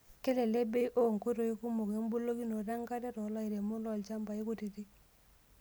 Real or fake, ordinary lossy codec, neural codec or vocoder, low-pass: real; none; none; none